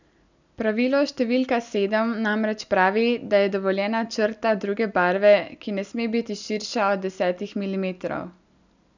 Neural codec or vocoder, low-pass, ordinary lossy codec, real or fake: none; 7.2 kHz; none; real